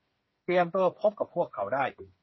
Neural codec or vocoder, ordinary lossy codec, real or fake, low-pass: codec, 16 kHz, 8 kbps, FreqCodec, smaller model; MP3, 24 kbps; fake; 7.2 kHz